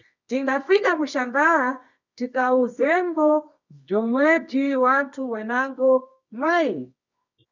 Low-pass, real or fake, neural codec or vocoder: 7.2 kHz; fake; codec, 24 kHz, 0.9 kbps, WavTokenizer, medium music audio release